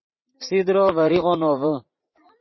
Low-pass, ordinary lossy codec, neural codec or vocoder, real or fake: 7.2 kHz; MP3, 24 kbps; vocoder, 44.1 kHz, 80 mel bands, Vocos; fake